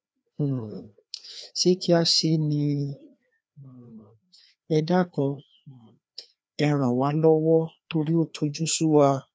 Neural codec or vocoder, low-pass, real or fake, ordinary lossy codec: codec, 16 kHz, 2 kbps, FreqCodec, larger model; none; fake; none